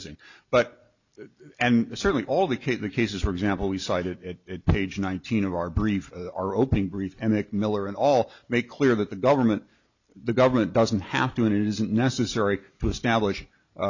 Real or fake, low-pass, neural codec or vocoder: real; 7.2 kHz; none